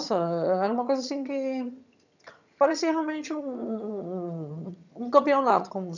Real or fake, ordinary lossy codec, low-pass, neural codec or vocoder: fake; none; 7.2 kHz; vocoder, 22.05 kHz, 80 mel bands, HiFi-GAN